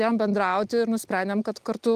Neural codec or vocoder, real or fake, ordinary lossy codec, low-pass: none; real; Opus, 32 kbps; 14.4 kHz